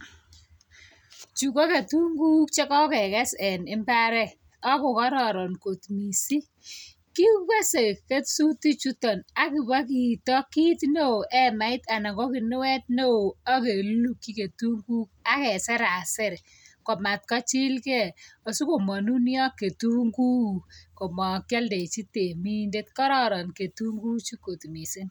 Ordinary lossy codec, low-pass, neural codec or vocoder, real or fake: none; none; none; real